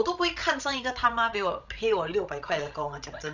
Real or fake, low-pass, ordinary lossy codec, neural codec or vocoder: fake; 7.2 kHz; none; codec, 16 kHz, 16 kbps, FreqCodec, larger model